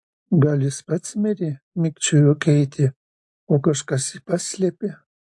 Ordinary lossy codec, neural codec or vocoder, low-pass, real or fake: AAC, 64 kbps; none; 10.8 kHz; real